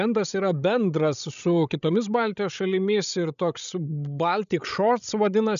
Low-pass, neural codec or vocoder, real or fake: 7.2 kHz; codec, 16 kHz, 16 kbps, FreqCodec, larger model; fake